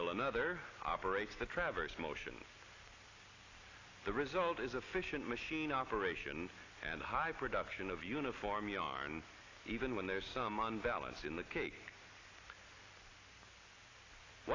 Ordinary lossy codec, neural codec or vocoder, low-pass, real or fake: AAC, 32 kbps; none; 7.2 kHz; real